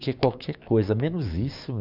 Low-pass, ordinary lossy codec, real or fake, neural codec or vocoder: 5.4 kHz; none; fake; codec, 16 kHz, 6 kbps, DAC